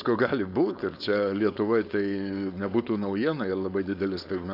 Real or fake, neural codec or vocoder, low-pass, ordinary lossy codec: fake; codec, 16 kHz, 4.8 kbps, FACodec; 5.4 kHz; AAC, 48 kbps